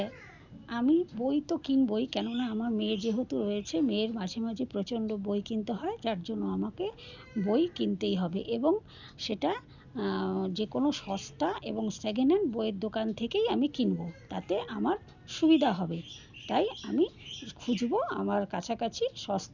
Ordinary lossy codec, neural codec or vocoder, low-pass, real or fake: none; none; 7.2 kHz; real